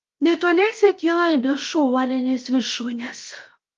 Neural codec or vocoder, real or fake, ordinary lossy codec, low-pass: codec, 16 kHz, 0.7 kbps, FocalCodec; fake; Opus, 32 kbps; 7.2 kHz